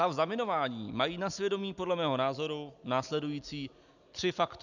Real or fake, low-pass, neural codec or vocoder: real; 7.2 kHz; none